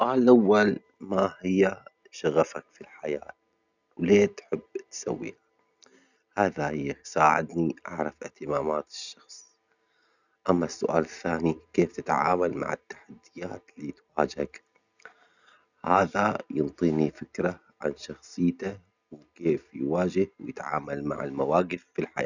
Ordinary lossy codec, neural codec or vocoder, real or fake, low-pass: none; none; real; 7.2 kHz